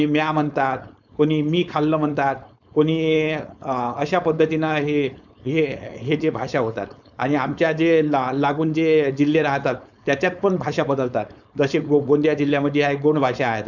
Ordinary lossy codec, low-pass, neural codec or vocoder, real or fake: none; 7.2 kHz; codec, 16 kHz, 4.8 kbps, FACodec; fake